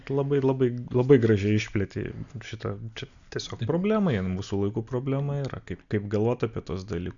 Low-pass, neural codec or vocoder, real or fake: 7.2 kHz; none; real